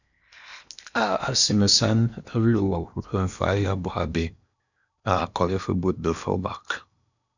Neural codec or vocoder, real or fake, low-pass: codec, 16 kHz in and 24 kHz out, 0.8 kbps, FocalCodec, streaming, 65536 codes; fake; 7.2 kHz